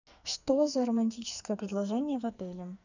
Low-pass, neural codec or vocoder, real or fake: 7.2 kHz; codec, 44.1 kHz, 2.6 kbps, SNAC; fake